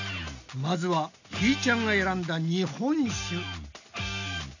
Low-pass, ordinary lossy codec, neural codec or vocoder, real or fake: 7.2 kHz; none; none; real